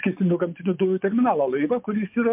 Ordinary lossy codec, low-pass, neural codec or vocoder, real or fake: MP3, 32 kbps; 3.6 kHz; vocoder, 24 kHz, 100 mel bands, Vocos; fake